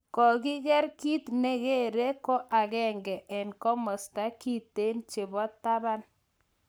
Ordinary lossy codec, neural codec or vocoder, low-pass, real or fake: none; codec, 44.1 kHz, 7.8 kbps, Pupu-Codec; none; fake